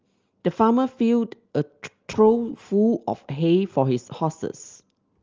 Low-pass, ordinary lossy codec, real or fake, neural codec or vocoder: 7.2 kHz; Opus, 24 kbps; real; none